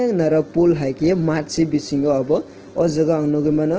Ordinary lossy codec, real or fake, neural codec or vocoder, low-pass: Opus, 16 kbps; real; none; 7.2 kHz